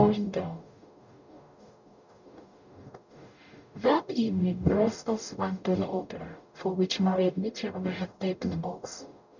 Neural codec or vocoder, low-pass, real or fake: codec, 44.1 kHz, 0.9 kbps, DAC; 7.2 kHz; fake